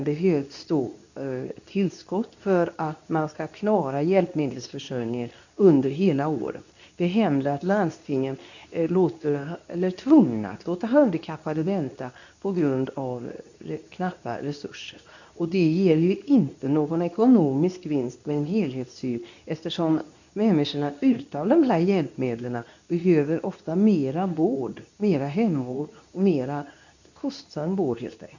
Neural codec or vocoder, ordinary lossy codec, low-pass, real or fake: codec, 24 kHz, 0.9 kbps, WavTokenizer, medium speech release version 2; none; 7.2 kHz; fake